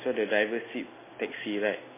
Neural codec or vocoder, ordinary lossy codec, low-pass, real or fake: none; MP3, 16 kbps; 3.6 kHz; real